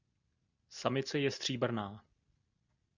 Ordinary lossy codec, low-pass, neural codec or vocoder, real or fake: Opus, 64 kbps; 7.2 kHz; none; real